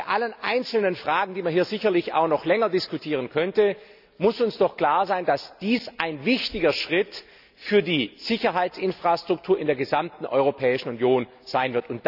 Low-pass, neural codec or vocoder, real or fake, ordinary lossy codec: 5.4 kHz; none; real; none